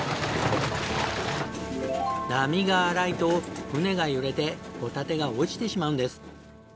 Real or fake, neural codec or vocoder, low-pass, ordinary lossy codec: real; none; none; none